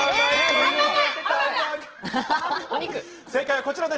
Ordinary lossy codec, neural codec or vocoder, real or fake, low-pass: Opus, 16 kbps; none; real; 7.2 kHz